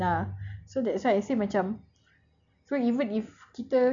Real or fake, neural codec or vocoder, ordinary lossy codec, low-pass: real; none; none; 7.2 kHz